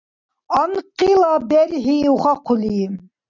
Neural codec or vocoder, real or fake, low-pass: none; real; 7.2 kHz